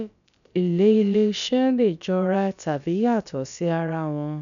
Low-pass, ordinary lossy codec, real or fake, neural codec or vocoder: 7.2 kHz; none; fake; codec, 16 kHz, about 1 kbps, DyCAST, with the encoder's durations